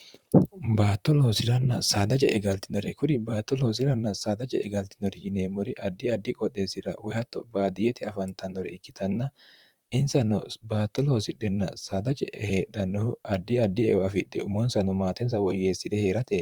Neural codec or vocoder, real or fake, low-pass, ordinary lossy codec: vocoder, 44.1 kHz, 128 mel bands, Pupu-Vocoder; fake; 19.8 kHz; Opus, 64 kbps